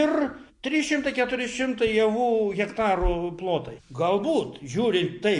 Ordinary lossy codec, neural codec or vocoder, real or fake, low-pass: MP3, 48 kbps; none; real; 10.8 kHz